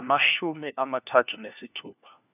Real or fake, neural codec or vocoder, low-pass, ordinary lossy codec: fake; codec, 16 kHz, 1 kbps, FunCodec, trained on LibriTTS, 50 frames a second; 3.6 kHz; none